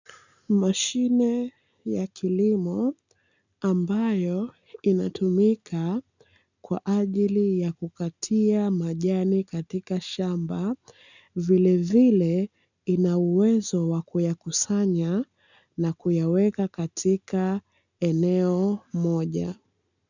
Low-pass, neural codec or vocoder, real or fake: 7.2 kHz; none; real